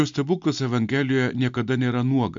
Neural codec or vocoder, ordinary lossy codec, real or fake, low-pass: none; MP3, 64 kbps; real; 7.2 kHz